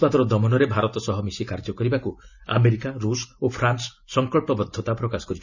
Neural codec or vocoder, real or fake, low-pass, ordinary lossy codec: none; real; 7.2 kHz; none